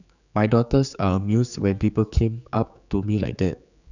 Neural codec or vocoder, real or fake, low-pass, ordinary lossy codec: codec, 16 kHz, 4 kbps, X-Codec, HuBERT features, trained on general audio; fake; 7.2 kHz; none